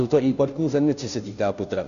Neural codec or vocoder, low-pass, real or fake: codec, 16 kHz, 0.5 kbps, FunCodec, trained on Chinese and English, 25 frames a second; 7.2 kHz; fake